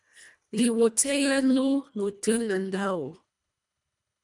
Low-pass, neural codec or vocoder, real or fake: 10.8 kHz; codec, 24 kHz, 1.5 kbps, HILCodec; fake